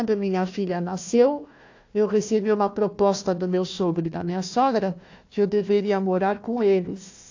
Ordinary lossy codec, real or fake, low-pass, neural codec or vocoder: AAC, 48 kbps; fake; 7.2 kHz; codec, 16 kHz, 1 kbps, FunCodec, trained on Chinese and English, 50 frames a second